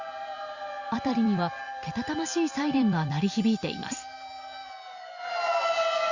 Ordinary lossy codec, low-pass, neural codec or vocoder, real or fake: Opus, 64 kbps; 7.2 kHz; vocoder, 44.1 kHz, 128 mel bands every 256 samples, BigVGAN v2; fake